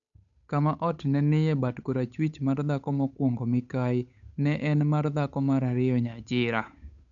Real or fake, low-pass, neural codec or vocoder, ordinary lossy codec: fake; 7.2 kHz; codec, 16 kHz, 8 kbps, FunCodec, trained on Chinese and English, 25 frames a second; AAC, 64 kbps